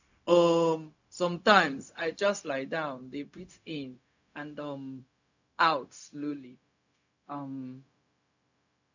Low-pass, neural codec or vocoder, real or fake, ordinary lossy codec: 7.2 kHz; codec, 16 kHz, 0.4 kbps, LongCat-Audio-Codec; fake; none